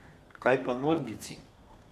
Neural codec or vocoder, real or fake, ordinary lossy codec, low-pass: codec, 32 kHz, 1.9 kbps, SNAC; fake; MP3, 96 kbps; 14.4 kHz